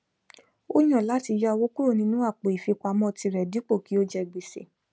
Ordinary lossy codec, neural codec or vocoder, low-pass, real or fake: none; none; none; real